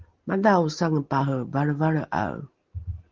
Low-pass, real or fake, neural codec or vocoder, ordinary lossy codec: 7.2 kHz; real; none; Opus, 16 kbps